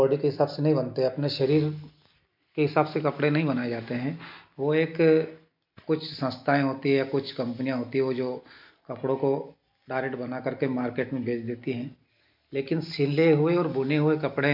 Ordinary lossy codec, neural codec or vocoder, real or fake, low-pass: MP3, 48 kbps; none; real; 5.4 kHz